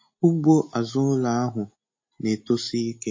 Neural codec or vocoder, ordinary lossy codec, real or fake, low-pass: none; MP3, 32 kbps; real; 7.2 kHz